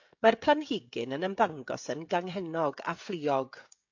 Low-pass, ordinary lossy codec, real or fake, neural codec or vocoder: 7.2 kHz; AAC, 48 kbps; fake; codec, 16 kHz, 16 kbps, FreqCodec, smaller model